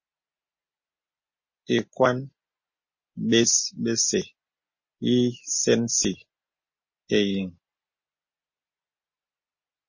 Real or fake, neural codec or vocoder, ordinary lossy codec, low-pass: real; none; MP3, 32 kbps; 7.2 kHz